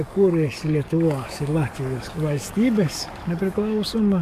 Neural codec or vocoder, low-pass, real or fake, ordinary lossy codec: codec, 44.1 kHz, 7.8 kbps, DAC; 14.4 kHz; fake; AAC, 96 kbps